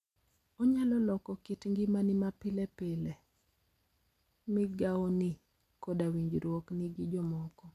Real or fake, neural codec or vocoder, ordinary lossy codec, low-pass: real; none; none; 14.4 kHz